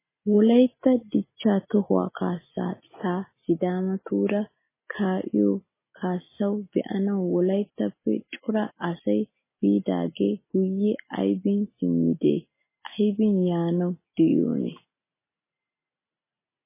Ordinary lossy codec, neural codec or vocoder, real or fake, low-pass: MP3, 16 kbps; none; real; 3.6 kHz